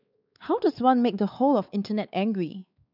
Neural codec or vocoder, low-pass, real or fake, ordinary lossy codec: codec, 16 kHz, 4 kbps, X-Codec, WavLM features, trained on Multilingual LibriSpeech; 5.4 kHz; fake; none